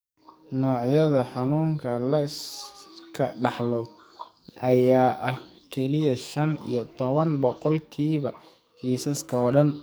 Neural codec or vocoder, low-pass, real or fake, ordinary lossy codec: codec, 44.1 kHz, 2.6 kbps, SNAC; none; fake; none